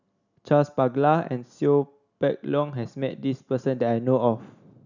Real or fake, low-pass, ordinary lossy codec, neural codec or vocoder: real; 7.2 kHz; none; none